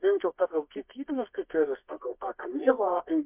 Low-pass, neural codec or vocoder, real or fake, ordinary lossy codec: 3.6 kHz; codec, 24 kHz, 0.9 kbps, WavTokenizer, medium music audio release; fake; MP3, 32 kbps